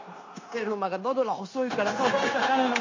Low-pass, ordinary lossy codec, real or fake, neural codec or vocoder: 7.2 kHz; MP3, 32 kbps; fake; codec, 16 kHz, 0.9 kbps, LongCat-Audio-Codec